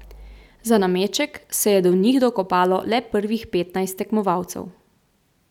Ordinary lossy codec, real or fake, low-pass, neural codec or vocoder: none; real; 19.8 kHz; none